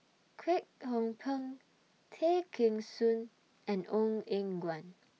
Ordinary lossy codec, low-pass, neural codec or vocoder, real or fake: none; none; none; real